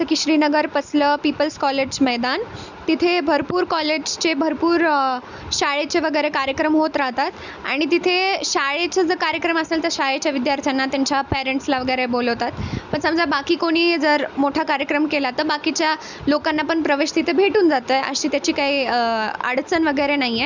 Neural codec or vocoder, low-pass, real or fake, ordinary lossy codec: none; 7.2 kHz; real; none